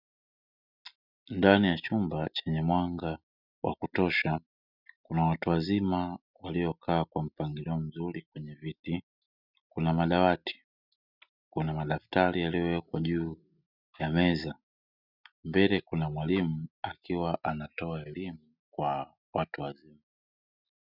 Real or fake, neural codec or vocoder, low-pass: real; none; 5.4 kHz